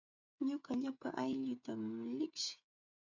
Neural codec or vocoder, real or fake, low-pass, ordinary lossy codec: codec, 16 kHz, 16 kbps, FreqCodec, smaller model; fake; 7.2 kHz; MP3, 64 kbps